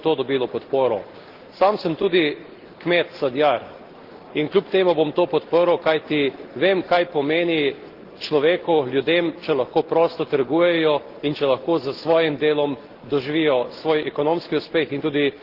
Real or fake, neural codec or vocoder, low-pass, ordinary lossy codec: real; none; 5.4 kHz; Opus, 16 kbps